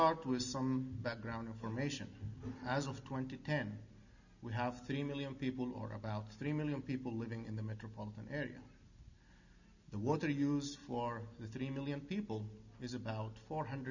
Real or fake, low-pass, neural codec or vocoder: real; 7.2 kHz; none